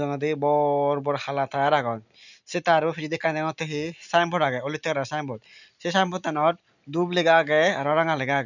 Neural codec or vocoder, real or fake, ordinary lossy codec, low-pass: none; real; none; 7.2 kHz